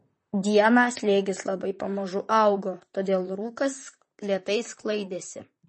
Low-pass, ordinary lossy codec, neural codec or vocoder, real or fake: 10.8 kHz; MP3, 32 kbps; vocoder, 44.1 kHz, 128 mel bands, Pupu-Vocoder; fake